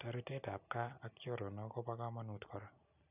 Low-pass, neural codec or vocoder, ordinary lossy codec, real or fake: 3.6 kHz; none; none; real